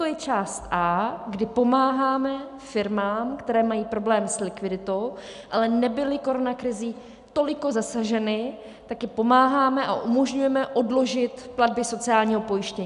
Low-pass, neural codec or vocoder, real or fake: 10.8 kHz; none; real